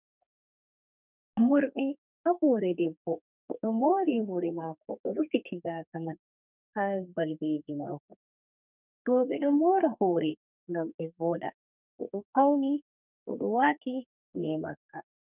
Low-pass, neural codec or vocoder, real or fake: 3.6 kHz; codec, 32 kHz, 1.9 kbps, SNAC; fake